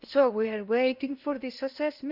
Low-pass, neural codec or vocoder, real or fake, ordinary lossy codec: 5.4 kHz; codec, 16 kHz in and 24 kHz out, 0.8 kbps, FocalCodec, streaming, 65536 codes; fake; none